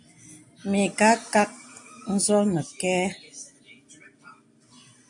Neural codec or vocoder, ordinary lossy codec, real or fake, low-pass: none; AAC, 64 kbps; real; 10.8 kHz